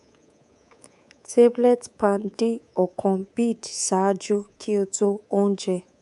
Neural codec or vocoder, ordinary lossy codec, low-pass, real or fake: codec, 24 kHz, 3.1 kbps, DualCodec; none; 10.8 kHz; fake